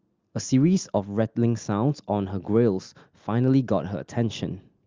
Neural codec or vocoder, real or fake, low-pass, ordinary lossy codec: none; real; 7.2 kHz; Opus, 32 kbps